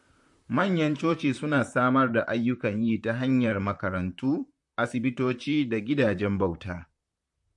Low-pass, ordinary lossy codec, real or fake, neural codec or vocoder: 10.8 kHz; MP3, 48 kbps; fake; vocoder, 44.1 kHz, 128 mel bands, Pupu-Vocoder